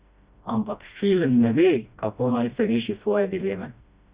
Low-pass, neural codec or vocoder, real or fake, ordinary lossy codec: 3.6 kHz; codec, 16 kHz, 1 kbps, FreqCodec, smaller model; fake; Opus, 64 kbps